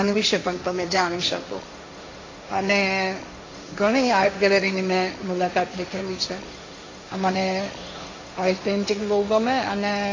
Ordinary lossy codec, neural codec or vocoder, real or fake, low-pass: AAC, 32 kbps; codec, 16 kHz, 1.1 kbps, Voila-Tokenizer; fake; 7.2 kHz